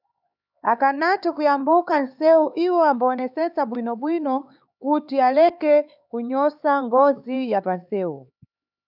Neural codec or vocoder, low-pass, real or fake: codec, 16 kHz, 4 kbps, X-Codec, HuBERT features, trained on LibriSpeech; 5.4 kHz; fake